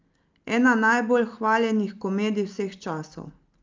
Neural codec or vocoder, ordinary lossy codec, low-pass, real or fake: none; Opus, 24 kbps; 7.2 kHz; real